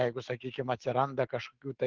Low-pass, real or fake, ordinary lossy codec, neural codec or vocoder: 7.2 kHz; fake; Opus, 16 kbps; autoencoder, 48 kHz, 128 numbers a frame, DAC-VAE, trained on Japanese speech